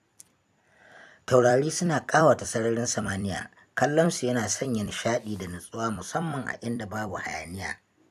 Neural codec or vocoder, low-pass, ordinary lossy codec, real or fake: vocoder, 44.1 kHz, 128 mel bands every 256 samples, BigVGAN v2; 14.4 kHz; none; fake